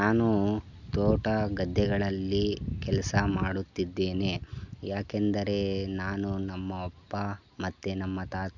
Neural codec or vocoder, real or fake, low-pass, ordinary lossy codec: none; real; 7.2 kHz; none